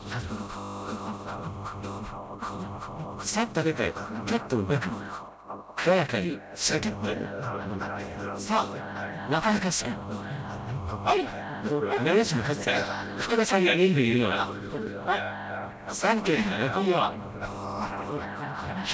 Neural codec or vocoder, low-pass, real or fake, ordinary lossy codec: codec, 16 kHz, 0.5 kbps, FreqCodec, smaller model; none; fake; none